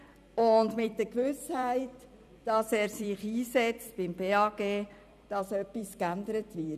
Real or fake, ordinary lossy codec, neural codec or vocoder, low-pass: fake; none; vocoder, 44.1 kHz, 128 mel bands every 256 samples, BigVGAN v2; 14.4 kHz